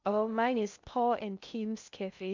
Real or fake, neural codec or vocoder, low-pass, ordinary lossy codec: fake; codec, 16 kHz in and 24 kHz out, 0.6 kbps, FocalCodec, streaming, 2048 codes; 7.2 kHz; MP3, 64 kbps